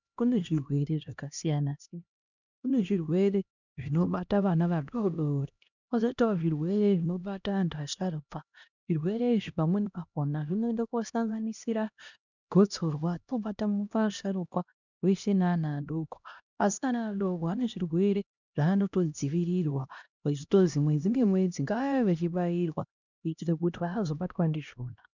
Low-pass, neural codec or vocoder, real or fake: 7.2 kHz; codec, 16 kHz, 1 kbps, X-Codec, HuBERT features, trained on LibriSpeech; fake